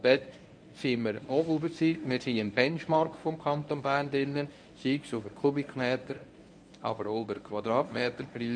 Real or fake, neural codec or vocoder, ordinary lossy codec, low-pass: fake; codec, 24 kHz, 0.9 kbps, WavTokenizer, medium speech release version 1; none; 9.9 kHz